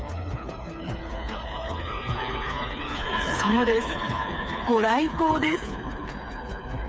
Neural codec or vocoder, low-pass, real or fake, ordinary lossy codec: codec, 16 kHz, 4 kbps, FreqCodec, smaller model; none; fake; none